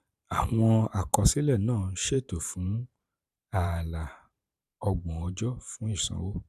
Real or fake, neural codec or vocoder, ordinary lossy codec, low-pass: fake; vocoder, 44.1 kHz, 128 mel bands every 512 samples, BigVGAN v2; none; 14.4 kHz